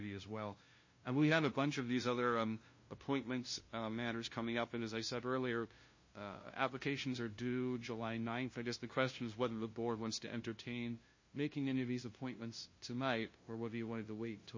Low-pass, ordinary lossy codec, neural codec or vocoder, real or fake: 7.2 kHz; MP3, 32 kbps; codec, 16 kHz, 0.5 kbps, FunCodec, trained on LibriTTS, 25 frames a second; fake